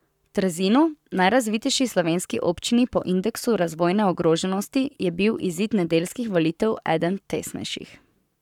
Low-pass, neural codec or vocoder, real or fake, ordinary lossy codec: 19.8 kHz; codec, 44.1 kHz, 7.8 kbps, Pupu-Codec; fake; none